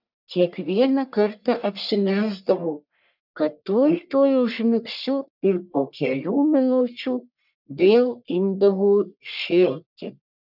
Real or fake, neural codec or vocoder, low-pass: fake; codec, 44.1 kHz, 1.7 kbps, Pupu-Codec; 5.4 kHz